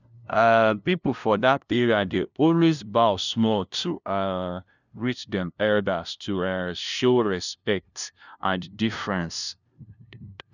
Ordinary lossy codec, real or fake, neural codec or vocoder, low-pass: none; fake; codec, 16 kHz, 0.5 kbps, FunCodec, trained on LibriTTS, 25 frames a second; 7.2 kHz